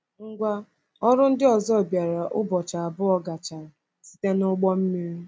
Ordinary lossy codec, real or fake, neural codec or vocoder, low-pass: none; real; none; none